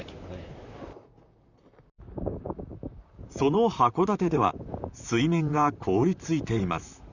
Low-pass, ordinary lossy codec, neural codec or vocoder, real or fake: 7.2 kHz; none; vocoder, 44.1 kHz, 128 mel bands, Pupu-Vocoder; fake